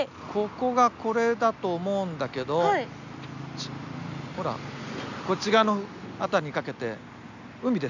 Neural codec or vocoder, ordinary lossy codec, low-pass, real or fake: none; none; 7.2 kHz; real